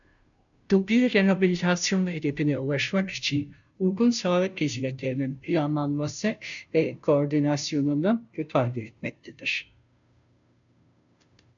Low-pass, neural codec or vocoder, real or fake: 7.2 kHz; codec, 16 kHz, 0.5 kbps, FunCodec, trained on Chinese and English, 25 frames a second; fake